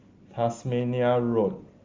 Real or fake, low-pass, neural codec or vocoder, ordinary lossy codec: real; 7.2 kHz; none; Opus, 32 kbps